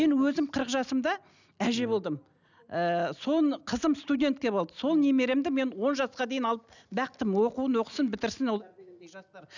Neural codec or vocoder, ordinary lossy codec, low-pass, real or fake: none; none; 7.2 kHz; real